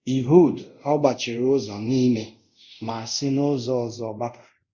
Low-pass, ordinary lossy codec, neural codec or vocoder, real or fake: 7.2 kHz; Opus, 64 kbps; codec, 24 kHz, 0.5 kbps, DualCodec; fake